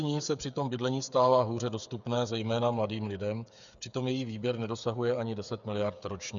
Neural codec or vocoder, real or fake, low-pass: codec, 16 kHz, 8 kbps, FreqCodec, smaller model; fake; 7.2 kHz